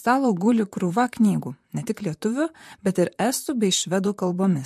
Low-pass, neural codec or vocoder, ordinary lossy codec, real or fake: 14.4 kHz; vocoder, 44.1 kHz, 128 mel bands, Pupu-Vocoder; MP3, 64 kbps; fake